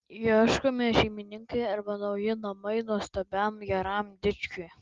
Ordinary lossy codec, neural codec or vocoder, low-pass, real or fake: Opus, 32 kbps; none; 7.2 kHz; real